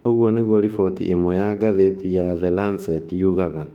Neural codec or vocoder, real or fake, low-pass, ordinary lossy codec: autoencoder, 48 kHz, 32 numbers a frame, DAC-VAE, trained on Japanese speech; fake; 19.8 kHz; none